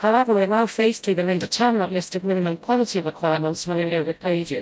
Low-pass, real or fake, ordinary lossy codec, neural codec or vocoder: none; fake; none; codec, 16 kHz, 0.5 kbps, FreqCodec, smaller model